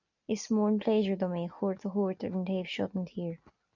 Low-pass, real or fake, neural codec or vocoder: 7.2 kHz; real; none